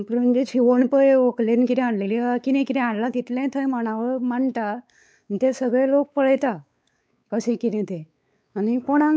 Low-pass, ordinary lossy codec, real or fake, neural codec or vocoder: none; none; fake; codec, 16 kHz, 4 kbps, X-Codec, WavLM features, trained on Multilingual LibriSpeech